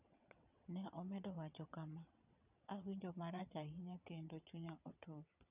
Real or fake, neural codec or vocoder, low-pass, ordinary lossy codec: fake; vocoder, 22.05 kHz, 80 mel bands, WaveNeXt; 3.6 kHz; none